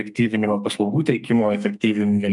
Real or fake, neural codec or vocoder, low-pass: fake; codec, 32 kHz, 1.9 kbps, SNAC; 10.8 kHz